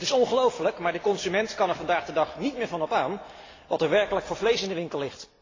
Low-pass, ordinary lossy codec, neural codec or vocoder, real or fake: 7.2 kHz; AAC, 32 kbps; none; real